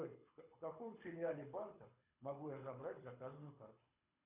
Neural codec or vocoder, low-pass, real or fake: codec, 24 kHz, 6 kbps, HILCodec; 3.6 kHz; fake